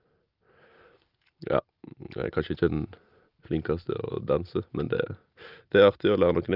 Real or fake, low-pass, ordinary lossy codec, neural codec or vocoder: real; 5.4 kHz; Opus, 24 kbps; none